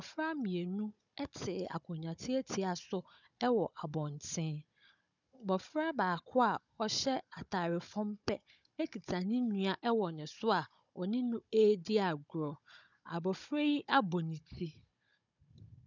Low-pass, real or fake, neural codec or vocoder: 7.2 kHz; fake; codec, 16 kHz, 16 kbps, FunCodec, trained on Chinese and English, 50 frames a second